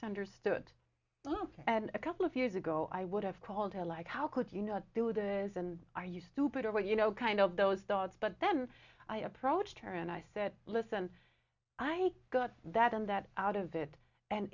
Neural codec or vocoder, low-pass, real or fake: none; 7.2 kHz; real